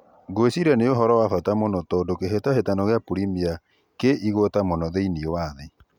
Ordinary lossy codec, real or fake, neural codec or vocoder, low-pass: none; real; none; 19.8 kHz